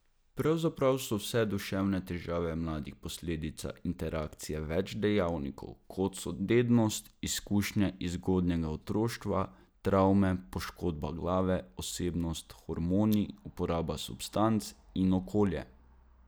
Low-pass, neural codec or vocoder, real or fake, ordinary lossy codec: none; none; real; none